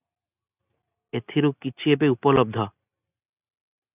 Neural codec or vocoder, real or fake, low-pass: none; real; 3.6 kHz